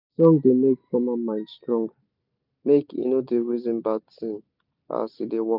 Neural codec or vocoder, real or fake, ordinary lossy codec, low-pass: vocoder, 44.1 kHz, 128 mel bands every 256 samples, BigVGAN v2; fake; none; 5.4 kHz